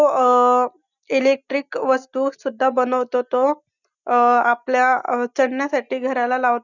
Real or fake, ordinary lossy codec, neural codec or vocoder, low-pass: real; none; none; 7.2 kHz